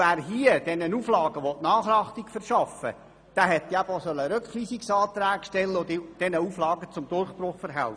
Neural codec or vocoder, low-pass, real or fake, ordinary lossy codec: none; 9.9 kHz; real; none